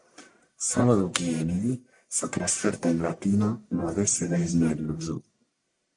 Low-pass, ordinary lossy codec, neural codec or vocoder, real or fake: 10.8 kHz; MP3, 96 kbps; codec, 44.1 kHz, 1.7 kbps, Pupu-Codec; fake